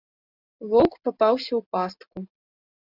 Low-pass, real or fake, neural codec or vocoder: 5.4 kHz; real; none